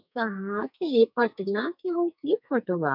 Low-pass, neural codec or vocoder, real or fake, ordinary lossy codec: 5.4 kHz; codec, 44.1 kHz, 2.6 kbps, SNAC; fake; none